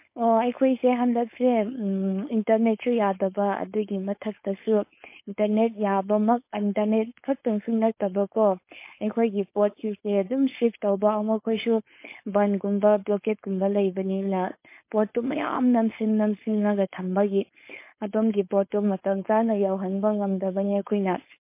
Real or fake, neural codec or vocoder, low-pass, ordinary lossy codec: fake; codec, 16 kHz, 4.8 kbps, FACodec; 3.6 kHz; MP3, 24 kbps